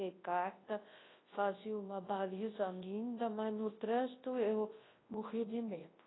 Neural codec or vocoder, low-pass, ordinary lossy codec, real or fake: codec, 24 kHz, 0.9 kbps, WavTokenizer, large speech release; 7.2 kHz; AAC, 16 kbps; fake